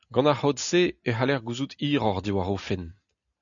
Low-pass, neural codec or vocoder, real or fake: 7.2 kHz; none; real